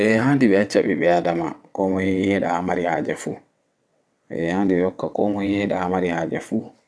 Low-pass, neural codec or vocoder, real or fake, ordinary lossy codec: none; vocoder, 22.05 kHz, 80 mel bands, WaveNeXt; fake; none